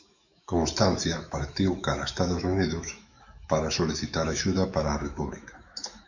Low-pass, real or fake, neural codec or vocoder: 7.2 kHz; fake; autoencoder, 48 kHz, 128 numbers a frame, DAC-VAE, trained on Japanese speech